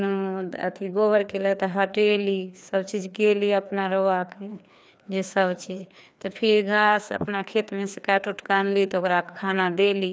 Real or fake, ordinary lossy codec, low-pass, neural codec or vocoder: fake; none; none; codec, 16 kHz, 2 kbps, FreqCodec, larger model